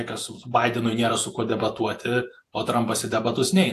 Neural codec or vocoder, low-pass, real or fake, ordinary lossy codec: vocoder, 48 kHz, 128 mel bands, Vocos; 14.4 kHz; fake; AAC, 64 kbps